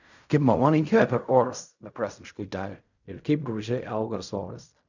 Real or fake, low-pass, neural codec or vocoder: fake; 7.2 kHz; codec, 16 kHz in and 24 kHz out, 0.4 kbps, LongCat-Audio-Codec, fine tuned four codebook decoder